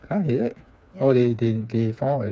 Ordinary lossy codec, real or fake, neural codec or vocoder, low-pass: none; fake; codec, 16 kHz, 4 kbps, FreqCodec, smaller model; none